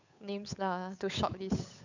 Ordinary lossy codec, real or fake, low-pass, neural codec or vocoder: none; fake; 7.2 kHz; codec, 16 kHz, 8 kbps, FunCodec, trained on Chinese and English, 25 frames a second